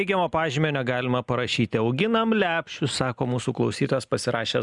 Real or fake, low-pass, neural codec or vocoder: real; 10.8 kHz; none